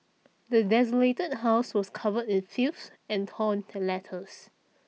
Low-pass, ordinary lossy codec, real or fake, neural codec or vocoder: none; none; real; none